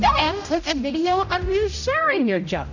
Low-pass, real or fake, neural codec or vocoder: 7.2 kHz; fake; codec, 16 kHz, 0.5 kbps, X-Codec, HuBERT features, trained on general audio